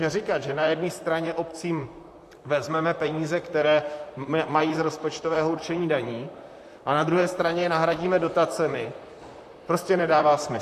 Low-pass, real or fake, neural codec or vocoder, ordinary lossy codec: 14.4 kHz; fake; vocoder, 44.1 kHz, 128 mel bands, Pupu-Vocoder; AAC, 64 kbps